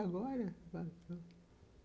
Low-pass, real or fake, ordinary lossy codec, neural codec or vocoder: none; real; none; none